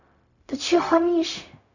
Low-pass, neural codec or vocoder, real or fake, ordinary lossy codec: 7.2 kHz; codec, 16 kHz, 0.4 kbps, LongCat-Audio-Codec; fake; none